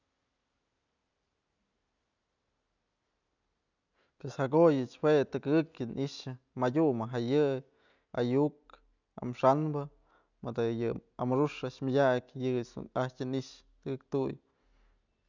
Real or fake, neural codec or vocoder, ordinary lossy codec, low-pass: real; none; none; 7.2 kHz